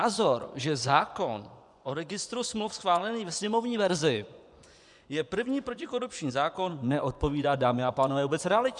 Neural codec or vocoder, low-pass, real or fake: vocoder, 24 kHz, 100 mel bands, Vocos; 10.8 kHz; fake